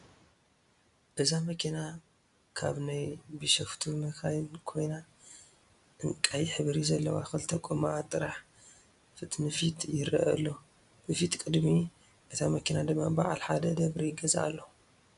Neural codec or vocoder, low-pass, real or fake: none; 10.8 kHz; real